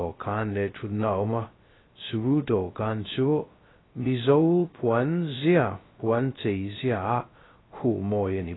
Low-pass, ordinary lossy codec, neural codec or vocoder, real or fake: 7.2 kHz; AAC, 16 kbps; codec, 16 kHz, 0.2 kbps, FocalCodec; fake